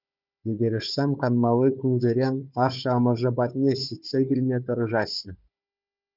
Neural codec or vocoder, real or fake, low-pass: codec, 16 kHz, 4 kbps, FunCodec, trained on Chinese and English, 50 frames a second; fake; 5.4 kHz